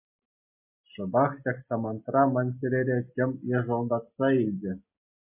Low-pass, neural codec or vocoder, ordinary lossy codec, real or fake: 3.6 kHz; none; MP3, 24 kbps; real